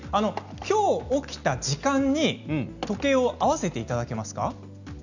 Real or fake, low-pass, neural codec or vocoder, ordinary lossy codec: real; 7.2 kHz; none; none